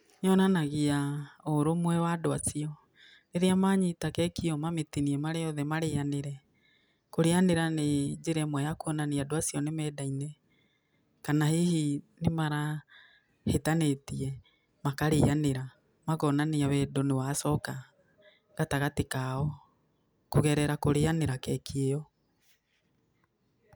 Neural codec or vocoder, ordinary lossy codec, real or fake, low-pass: none; none; real; none